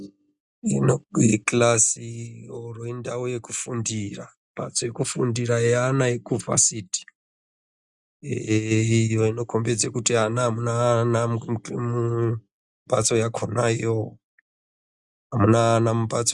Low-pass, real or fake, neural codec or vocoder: 10.8 kHz; real; none